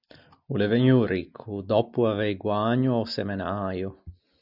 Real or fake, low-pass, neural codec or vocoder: real; 5.4 kHz; none